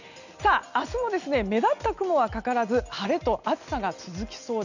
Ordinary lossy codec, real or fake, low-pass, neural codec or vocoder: none; real; 7.2 kHz; none